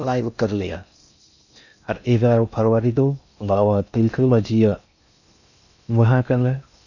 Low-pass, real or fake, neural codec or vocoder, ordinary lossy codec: 7.2 kHz; fake; codec, 16 kHz in and 24 kHz out, 0.6 kbps, FocalCodec, streaming, 4096 codes; none